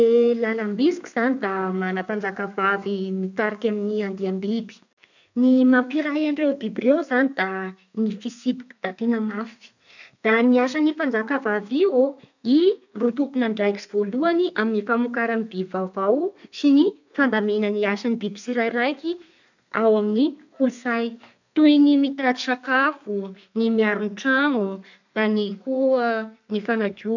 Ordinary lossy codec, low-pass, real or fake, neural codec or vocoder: none; 7.2 kHz; fake; codec, 32 kHz, 1.9 kbps, SNAC